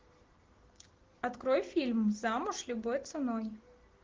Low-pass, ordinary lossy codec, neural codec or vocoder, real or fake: 7.2 kHz; Opus, 16 kbps; none; real